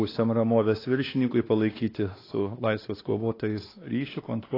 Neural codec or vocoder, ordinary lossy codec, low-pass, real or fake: codec, 16 kHz, 2 kbps, X-Codec, WavLM features, trained on Multilingual LibriSpeech; AAC, 24 kbps; 5.4 kHz; fake